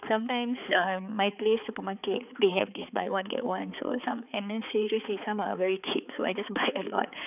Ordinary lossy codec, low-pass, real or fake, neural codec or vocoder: none; 3.6 kHz; fake; codec, 16 kHz, 4 kbps, X-Codec, HuBERT features, trained on balanced general audio